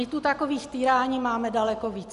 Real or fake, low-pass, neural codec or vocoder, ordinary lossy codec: real; 10.8 kHz; none; MP3, 96 kbps